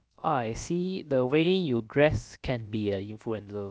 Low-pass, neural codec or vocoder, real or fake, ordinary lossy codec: none; codec, 16 kHz, about 1 kbps, DyCAST, with the encoder's durations; fake; none